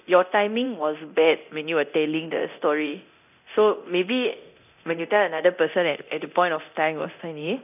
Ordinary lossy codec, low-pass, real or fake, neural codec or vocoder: none; 3.6 kHz; fake; codec, 24 kHz, 0.9 kbps, DualCodec